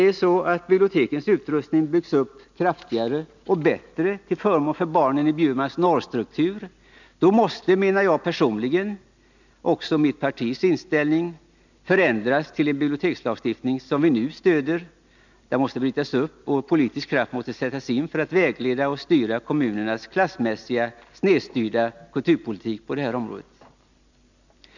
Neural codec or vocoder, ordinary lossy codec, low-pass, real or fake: none; none; 7.2 kHz; real